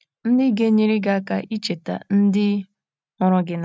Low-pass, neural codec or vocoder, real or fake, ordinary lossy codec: none; none; real; none